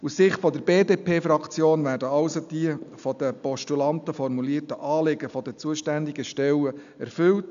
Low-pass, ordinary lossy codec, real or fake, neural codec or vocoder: 7.2 kHz; AAC, 96 kbps; real; none